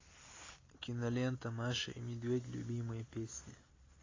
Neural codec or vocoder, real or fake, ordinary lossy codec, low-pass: none; real; AAC, 32 kbps; 7.2 kHz